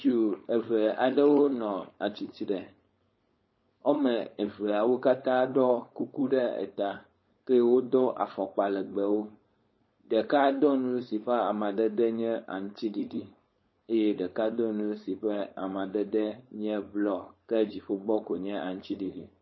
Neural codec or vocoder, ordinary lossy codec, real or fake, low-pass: codec, 16 kHz, 4.8 kbps, FACodec; MP3, 24 kbps; fake; 7.2 kHz